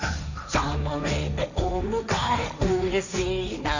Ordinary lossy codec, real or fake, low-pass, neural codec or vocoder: none; fake; 7.2 kHz; codec, 16 kHz, 1.1 kbps, Voila-Tokenizer